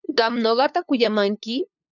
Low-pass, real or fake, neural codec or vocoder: 7.2 kHz; fake; codec, 16 kHz, 2 kbps, FunCodec, trained on LibriTTS, 25 frames a second